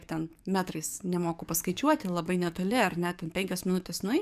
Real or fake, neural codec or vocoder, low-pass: fake; codec, 44.1 kHz, 7.8 kbps, DAC; 14.4 kHz